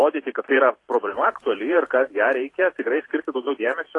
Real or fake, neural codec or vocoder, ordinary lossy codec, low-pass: fake; vocoder, 44.1 kHz, 128 mel bands every 512 samples, BigVGAN v2; AAC, 32 kbps; 10.8 kHz